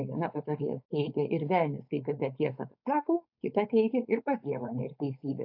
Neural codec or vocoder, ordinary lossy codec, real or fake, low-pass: codec, 16 kHz, 4.8 kbps, FACodec; AAC, 48 kbps; fake; 5.4 kHz